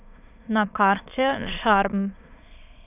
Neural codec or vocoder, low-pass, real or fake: autoencoder, 22.05 kHz, a latent of 192 numbers a frame, VITS, trained on many speakers; 3.6 kHz; fake